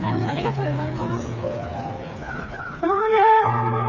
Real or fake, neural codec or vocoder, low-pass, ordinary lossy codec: fake; codec, 16 kHz, 4 kbps, FreqCodec, smaller model; 7.2 kHz; none